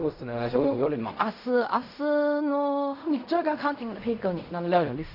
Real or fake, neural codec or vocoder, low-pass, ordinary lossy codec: fake; codec, 16 kHz in and 24 kHz out, 0.4 kbps, LongCat-Audio-Codec, fine tuned four codebook decoder; 5.4 kHz; none